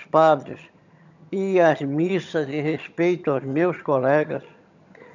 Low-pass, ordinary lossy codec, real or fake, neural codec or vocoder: 7.2 kHz; none; fake; vocoder, 22.05 kHz, 80 mel bands, HiFi-GAN